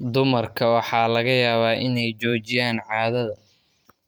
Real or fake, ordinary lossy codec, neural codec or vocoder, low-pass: fake; none; vocoder, 44.1 kHz, 128 mel bands every 512 samples, BigVGAN v2; none